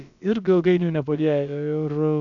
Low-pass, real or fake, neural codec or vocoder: 7.2 kHz; fake; codec, 16 kHz, about 1 kbps, DyCAST, with the encoder's durations